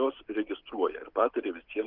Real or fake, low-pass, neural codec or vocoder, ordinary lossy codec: real; 5.4 kHz; none; Opus, 32 kbps